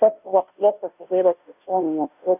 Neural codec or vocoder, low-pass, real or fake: codec, 16 kHz, 0.5 kbps, FunCodec, trained on Chinese and English, 25 frames a second; 3.6 kHz; fake